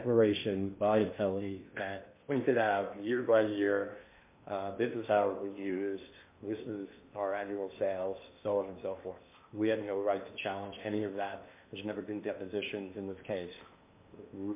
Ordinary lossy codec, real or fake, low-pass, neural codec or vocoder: MP3, 24 kbps; fake; 3.6 kHz; codec, 16 kHz in and 24 kHz out, 0.8 kbps, FocalCodec, streaming, 65536 codes